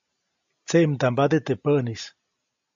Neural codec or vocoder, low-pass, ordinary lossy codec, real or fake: none; 7.2 kHz; AAC, 64 kbps; real